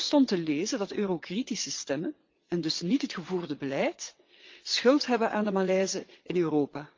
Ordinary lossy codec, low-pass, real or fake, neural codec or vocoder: Opus, 24 kbps; 7.2 kHz; fake; vocoder, 22.05 kHz, 80 mel bands, WaveNeXt